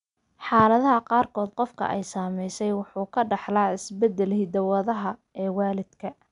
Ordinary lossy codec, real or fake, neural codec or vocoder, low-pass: none; real; none; 10.8 kHz